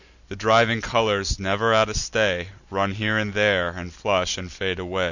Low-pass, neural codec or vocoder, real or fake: 7.2 kHz; none; real